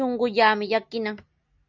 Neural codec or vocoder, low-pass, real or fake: none; 7.2 kHz; real